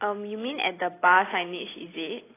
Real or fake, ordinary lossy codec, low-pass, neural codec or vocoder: real; AAC, 16 kbps; 3.6 kHz; none